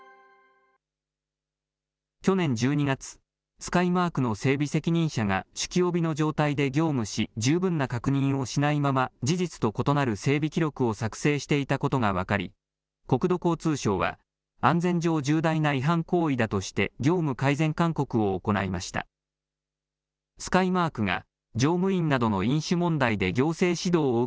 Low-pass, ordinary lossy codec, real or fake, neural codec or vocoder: none; none; real; none